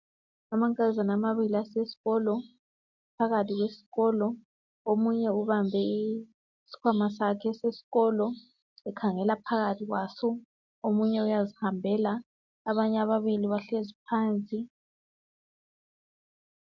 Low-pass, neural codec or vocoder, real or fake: 7.2 kHz; none; real